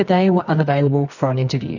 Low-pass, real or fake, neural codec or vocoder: 7.2 kHz; fake; codec, 24 kHz, 0.9 kbps, WavTokenizer, medium music audio release